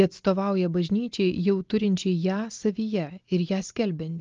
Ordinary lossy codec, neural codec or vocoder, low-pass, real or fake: Opus, 32 kbps; none; 7.2 kHz; real